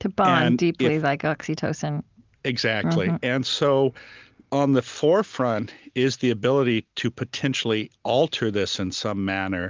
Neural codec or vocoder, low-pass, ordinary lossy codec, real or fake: none; 7.2 kHz; Opus, 24 kbps; real